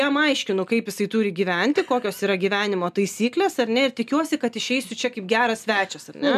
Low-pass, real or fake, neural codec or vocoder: 14.4 kHz; real; none